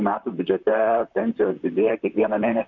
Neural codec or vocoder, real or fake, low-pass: vocoder, 44.1 kHz, 128 mel bands, Pupu-Vocoder; fake; 7.2 kHz